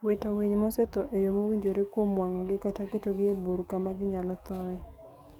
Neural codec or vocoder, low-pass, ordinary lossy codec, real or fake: codec, 44.1 kHz, 7.8 kbps, DAC; 19.8 kHz; none; fake